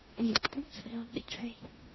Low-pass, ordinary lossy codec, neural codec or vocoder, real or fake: 7.2 kHz; MP3, 24 kbps; codec, 16 kHz in and 24 kHz out, 0.9 kbps, LongCat-Audio-Codec, four codebook decoder; fake